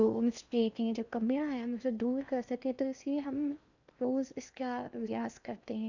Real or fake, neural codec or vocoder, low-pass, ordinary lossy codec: fake; codec, 16 kHz in and 24 kHz out, 0.8 kbps, FocalCodec, streaming, 65536 codes; 7.2 kHz; none